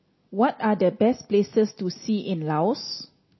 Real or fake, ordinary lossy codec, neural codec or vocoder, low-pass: real; MP3, 24 kbps; none; 7.2 kHz